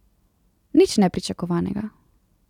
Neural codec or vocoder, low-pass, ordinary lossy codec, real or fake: none; 19.8 kHz; none; real